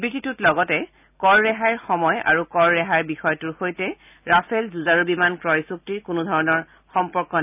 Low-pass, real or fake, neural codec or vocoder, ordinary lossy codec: 3.6 kHz; real; none; none